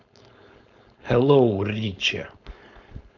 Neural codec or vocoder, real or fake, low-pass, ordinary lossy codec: codec, 16 kHz, 4.8 kbps, FACodec; fake; 7.2 kHz; none